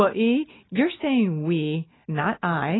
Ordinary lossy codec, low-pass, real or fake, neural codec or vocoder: AAC, 16 kbps; 7.2 kHz; real; none